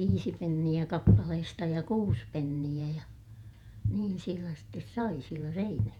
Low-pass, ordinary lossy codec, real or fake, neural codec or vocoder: 19.8 kHz; none; fake; vocoder, 48 kHz, 128 mel bands, Vocos